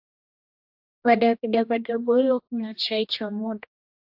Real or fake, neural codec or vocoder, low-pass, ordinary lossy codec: fake; codec, 16 kHz, 1 kbps, X-Codec, HuBERT features, trained on general audio; 5.4 kHz; AAC, 48 kbps